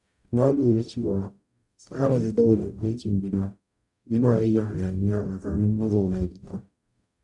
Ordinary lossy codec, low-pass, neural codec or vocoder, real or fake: none; 10.8 kHz; codec, 44.1 kHz, 0.9 kbps, DAC; fake